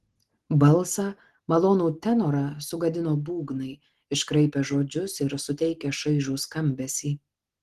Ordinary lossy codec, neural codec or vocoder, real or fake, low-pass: Opus, 16 kbps; none; real; 14.4 kHz